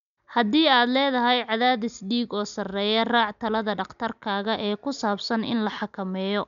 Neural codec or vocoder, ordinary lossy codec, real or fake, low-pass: none; none; real; 7.2 kHz